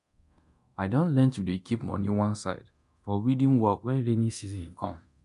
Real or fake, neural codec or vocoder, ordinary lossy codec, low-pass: fake; codec, 24 kHz, 0.9 kbps, DualCodec; MP3, 96 kbps; 10.8 kHz